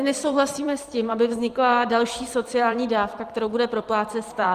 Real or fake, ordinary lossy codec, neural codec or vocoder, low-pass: fake; Opus, 24 kbps; vocoder, 44.1 kHz, 128 mel bands every 256 samples, BigVGAN v2; 14.4 kHz